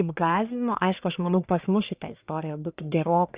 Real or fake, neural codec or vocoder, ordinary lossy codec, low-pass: fake; codec, 44.1 kHz, 1.7 kbps, Pupu-Codec; Opus, 64 kbps; 3.6 kHz